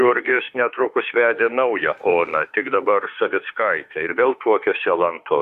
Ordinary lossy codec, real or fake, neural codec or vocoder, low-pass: Opus, 64 kbps; fake; autoencoder, 48 kHz, 32 numbers a frame, DAC-VAE, trained on Japanese speech; 14.4 kHz